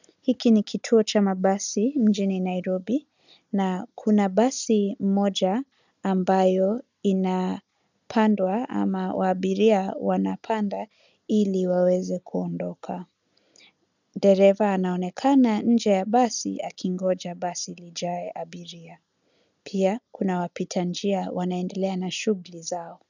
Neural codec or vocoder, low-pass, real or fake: none; 7.2 kHz; real